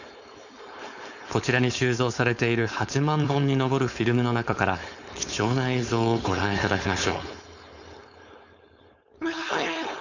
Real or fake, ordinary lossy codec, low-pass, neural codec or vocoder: fake; none; 7.2 kHz; codec, 16 kHz, 4.8 kbps, FACodec